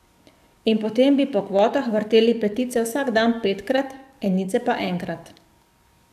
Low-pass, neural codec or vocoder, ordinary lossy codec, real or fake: 14.4 kHz; codec, 44.1 kHz, 7.8 kbps, DAC; none; fake